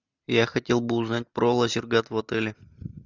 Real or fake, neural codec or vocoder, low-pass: real; none; 7.2 kHz